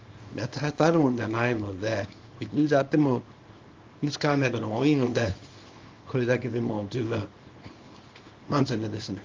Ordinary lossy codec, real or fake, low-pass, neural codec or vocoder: Opus, 32 kbps; fake; 7.2 kHz; codec, 24 kHz, 0.9 kbps, WavTokenizer, small release